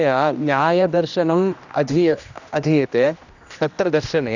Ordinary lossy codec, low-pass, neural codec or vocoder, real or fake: none; 7.2 kHz; codec, 16 kHz, 1 kbps, X-Codec, HuBERT features, trained on general audio; fake